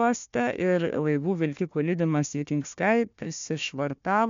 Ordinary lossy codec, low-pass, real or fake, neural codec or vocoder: MP3, 64 kbps; 7.2 kHz; fake; codec, 16 kHz, 1 kbps, FunCodec, trained on Chinese and English, 50 frames a second